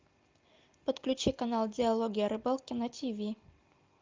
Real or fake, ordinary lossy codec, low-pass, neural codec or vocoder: real; Opus, 24 kbps; 7.2 kHz; none